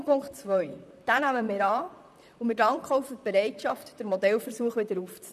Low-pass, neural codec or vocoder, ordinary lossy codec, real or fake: 14.4 kHz; vocoder, 44.1 kHz, 128 mel bands, Pupu-Vocoder; none; fake